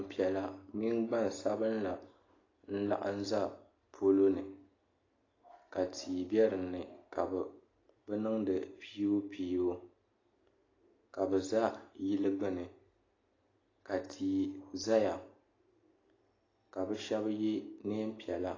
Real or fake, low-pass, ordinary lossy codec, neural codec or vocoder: real; 7.2 kHz; AAC, 32 kbps; none